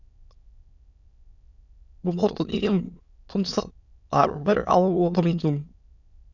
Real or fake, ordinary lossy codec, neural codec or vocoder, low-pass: fake; none; autoencoder, 22.05 kHz, a latent of 192 numbers a frame, VITS, trained on many speakers; 7.2 kHz